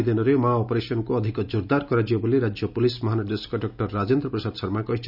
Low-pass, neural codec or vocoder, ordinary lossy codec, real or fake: 5.4 kHz; none; none; real